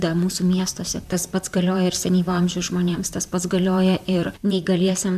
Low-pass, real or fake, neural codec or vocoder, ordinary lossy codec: 14.4 kHz; fake; vocoder, 44.1 kHz, 128 mel bands, Pupu-Vocoder; MP3, 96 kbps